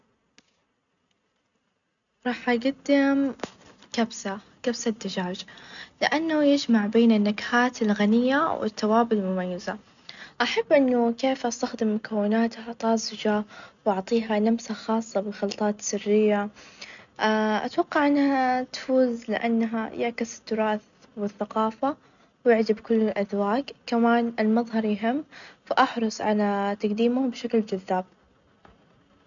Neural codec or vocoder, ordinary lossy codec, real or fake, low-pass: none; none; real; 7.2 kHz